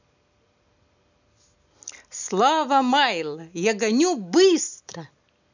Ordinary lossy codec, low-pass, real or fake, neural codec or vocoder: none; 7.2 kHz; real; none